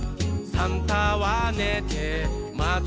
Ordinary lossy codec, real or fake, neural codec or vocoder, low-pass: none; real; none; none